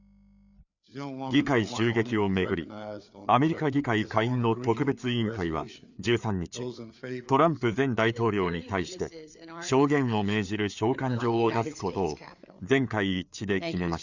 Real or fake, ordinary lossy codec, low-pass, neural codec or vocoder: fake; none; 7.2 kHz; codec, 16 kHz, 4 kbps, FreqCodec, larger model